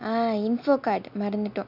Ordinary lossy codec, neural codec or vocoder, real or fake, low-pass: none; none; real; 5.4 kHz